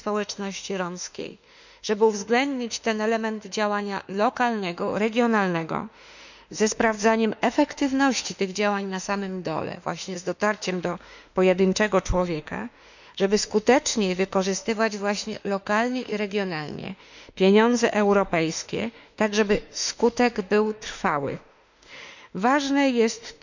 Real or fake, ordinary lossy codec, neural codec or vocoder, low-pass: fake; none; autoencoder, 48 kHz, 32 numbers a frame, DAC-VAE, trained on Japanese speech; 7.2 kHz